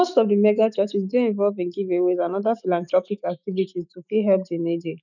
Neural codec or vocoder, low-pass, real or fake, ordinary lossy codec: autoencoder, 48 kHz, 128 numbers a frame, DAC-VAE, trained on Japanese speech; 7.2 kHz; fake; none